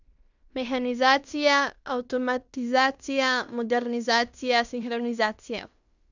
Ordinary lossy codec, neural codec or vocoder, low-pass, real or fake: none; codec, 16 kHz in and 24 kHz out, 0.9 kbps, LongCat-Audio-Codec, fine tuned four codebook decoder; 7.2 kHz; fake